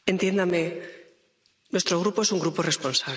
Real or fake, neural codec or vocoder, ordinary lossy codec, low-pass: real; none; none; none